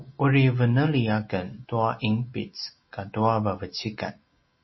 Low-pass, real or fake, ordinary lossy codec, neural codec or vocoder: 7.2 kHz; real; MP3, 24 kbps; none